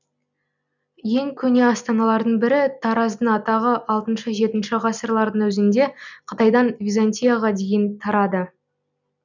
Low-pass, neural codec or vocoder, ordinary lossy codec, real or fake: 7.2 kHz; none; none; real